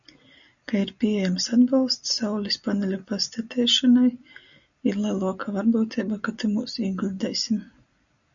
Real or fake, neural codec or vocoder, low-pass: real; none; 7.2 kHz